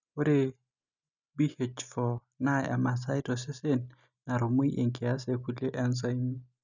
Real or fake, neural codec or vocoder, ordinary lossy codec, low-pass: real; none; none; 7.2 kHz